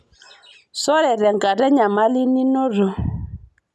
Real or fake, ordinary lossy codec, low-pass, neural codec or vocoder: real; none; none; none